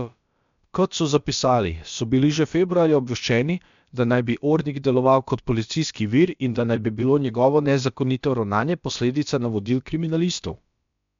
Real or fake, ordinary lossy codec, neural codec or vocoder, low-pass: fake; MP3, 64 kbps; codec, 16 kHz, about 1 kbps, DyCAST, with the encoder's durations; 7.2 kHz